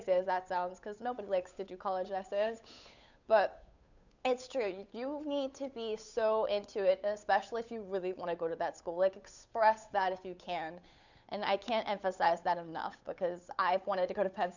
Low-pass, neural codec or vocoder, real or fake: 7.2 kHz; codec, 16 kHz, 8 kbps, FunCodec, trained on Chinese and English, 25 frames a second; fake